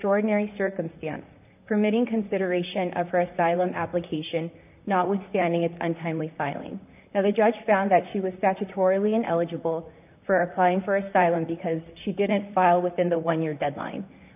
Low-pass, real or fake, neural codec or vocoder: 3.6 kHz; fake; vocoder, 44.1 kHz, 128 mel bands, Pupu-Vocoder